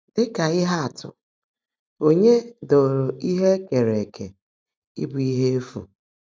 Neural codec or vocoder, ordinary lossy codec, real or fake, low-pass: none; none; real; none